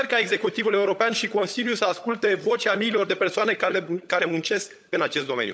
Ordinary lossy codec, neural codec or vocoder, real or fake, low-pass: none; codec, 16 kHz, 16 kbps, FunCodec, trained on LibriTTS, 50 frames a second; fake; none